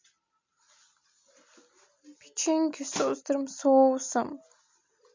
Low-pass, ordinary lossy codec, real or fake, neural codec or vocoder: 7.2 kHz; MP3, 64 kbps; real; none